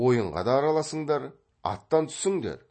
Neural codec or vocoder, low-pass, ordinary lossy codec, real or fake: none; 9.9 kHz; MP3, 32 kbps; real